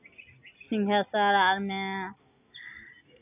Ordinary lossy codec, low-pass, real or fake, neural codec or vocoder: none; 3.6 kHz; real; none